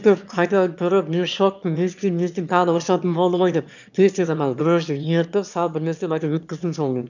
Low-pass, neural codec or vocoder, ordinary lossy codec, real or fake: 7.2 kHz; autoencoder, 22.05 kHz, a latent of 192 numbers a frame, VITS, trained on one speaker; none; fake